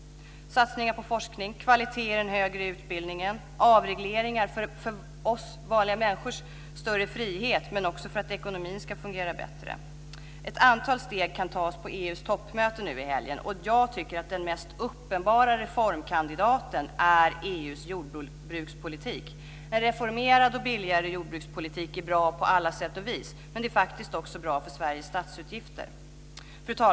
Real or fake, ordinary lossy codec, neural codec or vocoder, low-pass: real; none; none; none